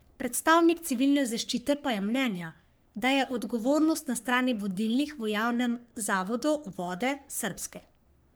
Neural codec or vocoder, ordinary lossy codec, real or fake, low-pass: codec, 44.1 kHz, 3.4 kbps, Pupu-Codec; none; fake; none